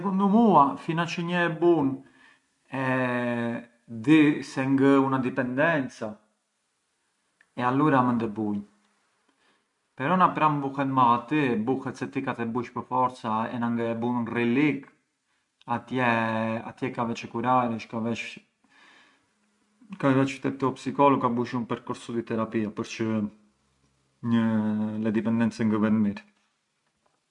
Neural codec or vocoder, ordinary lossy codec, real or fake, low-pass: none; MP3, 64 kbps; real; 10.8 kHz